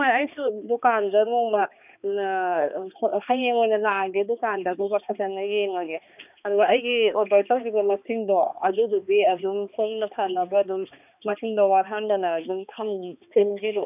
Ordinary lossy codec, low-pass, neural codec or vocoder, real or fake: none; 3.6 kHz; codec, 16 kHz, 2 kbps, X-Codec, HuBERT features, trained on balanced general audio; fake